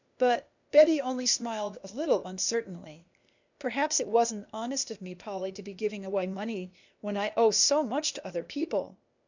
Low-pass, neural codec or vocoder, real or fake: 7.2 kHz; codec, 16 kHz, 0.8 kbps, ZipCodec; fake